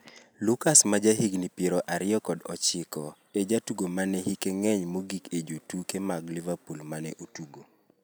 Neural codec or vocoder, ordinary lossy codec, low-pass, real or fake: none; none; none; real